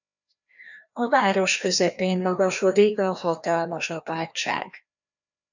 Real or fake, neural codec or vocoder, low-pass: fake; codec, 16 kHz, 1 kbps, FreqCodec, larger model; 7.2 kHz